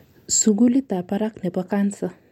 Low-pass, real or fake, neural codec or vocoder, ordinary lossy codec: 19.8 kHz; real; none; MP3, 64 kbps